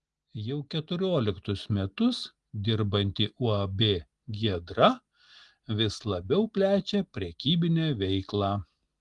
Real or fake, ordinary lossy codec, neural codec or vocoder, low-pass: real; Opus, 24 kbps; none; 10.8 kHz